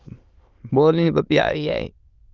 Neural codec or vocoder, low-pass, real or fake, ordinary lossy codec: autoencoder, 22.05 kHz, a latent of 192 numbers a frame, VITS, trained on many speakers; 7.2 kHz; fake; Opus, 32 kbps